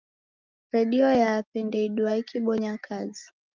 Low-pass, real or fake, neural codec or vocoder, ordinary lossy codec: 7.2 kHz; real; none; Opus, 32 kbps